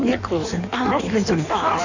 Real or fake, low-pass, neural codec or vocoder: fake; 7.2 kHz; codec, 16 kHz in and 24 kHz out, 1.1 kbps, FireRedTTS-2 codec